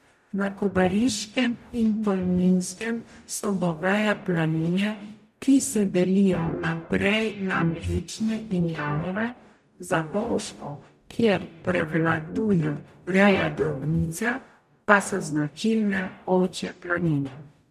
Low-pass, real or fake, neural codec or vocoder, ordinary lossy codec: 14.4 kHz; fake; codec, 44.1 kHz, 0.9 kbps, DAC; none